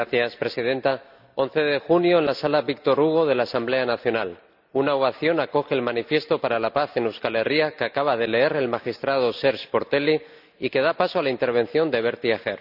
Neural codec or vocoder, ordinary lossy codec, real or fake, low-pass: none; none; real; 5.4 kHz